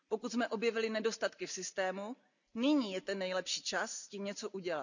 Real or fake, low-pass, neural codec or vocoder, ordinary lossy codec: real; 7.2 kHz; none; none